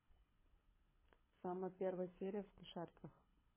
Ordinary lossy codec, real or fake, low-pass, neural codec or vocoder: MP3, 16 kbps; fake; 3.6 kHz; codec, 24 kHz, 6 kbps, HILCodec